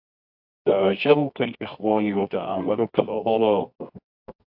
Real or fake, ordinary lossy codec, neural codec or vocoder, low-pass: fake; AAC, 48 kbps; codec, 24 kHz, 0.9 kbps, WavTokenizer, medium music audio release; 5.4 kHz